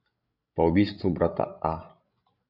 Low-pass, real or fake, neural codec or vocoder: 5.4 kHz; fake; codec, 16 kHz, 16 kbps, FreqCodec, larger model